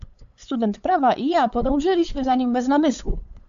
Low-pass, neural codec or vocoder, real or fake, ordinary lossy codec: 7.2 kHz; codec, 16 kHz, 8 kbps, FunCodec, trained on LibriTTS, 25 frames a second; fake; MP3, 64 kbps